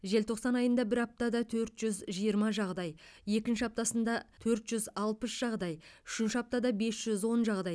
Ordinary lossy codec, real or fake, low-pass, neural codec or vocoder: none; real; none; none